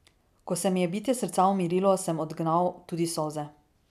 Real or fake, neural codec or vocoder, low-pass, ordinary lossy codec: real; none; 14.4 kHz; none